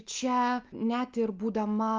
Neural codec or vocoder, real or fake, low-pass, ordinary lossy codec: none; real; 7.2 kHz; Opus, 32 kbps